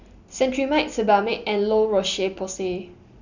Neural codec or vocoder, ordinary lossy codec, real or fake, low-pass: none; none; real; 7.2 kHz